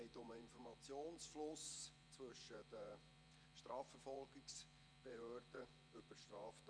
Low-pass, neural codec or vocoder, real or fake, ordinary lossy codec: 9.9 kHz; vocoder, 24 kHz, 100 mel bands, Vocos; fake; none